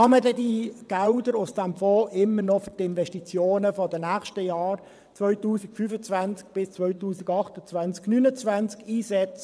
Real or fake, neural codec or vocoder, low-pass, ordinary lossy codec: fake; vocoder, 22.05 kHz, 80 mel bands, WaveNeXt; none; none